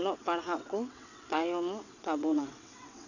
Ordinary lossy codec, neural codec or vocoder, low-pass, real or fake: none; vocoder, 22.05 kHz, 80 mel bands, WaveNeXt; 7.2 kHz; fake